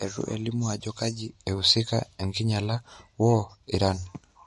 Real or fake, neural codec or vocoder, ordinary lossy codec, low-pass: real; none; MP3, 48 kbps; 14.4 kHz